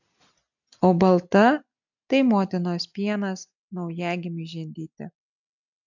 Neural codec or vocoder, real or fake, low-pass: none; real; 7.2 kHz